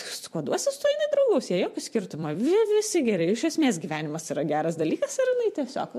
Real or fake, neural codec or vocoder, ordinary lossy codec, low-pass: fake; vocoder, 44.1 kHz, 128 mel bands every 256 samples, BigVGAN v2; MP3, 64 kbps; 14.4 kHz